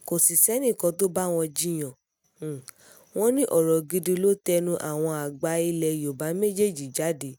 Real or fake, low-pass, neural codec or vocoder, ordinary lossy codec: real; none; none; none